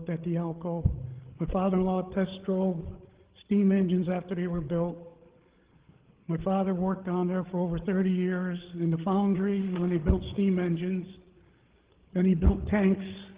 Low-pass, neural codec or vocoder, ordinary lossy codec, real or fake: 3.6 kHz; codec, 16 kHz, 8 kbps, FreqCodec, larger model; Opus, 16 kbps; fake